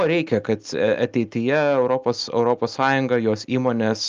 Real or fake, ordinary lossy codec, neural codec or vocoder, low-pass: real; Opus, 24 kbps; none; 7.2 kHz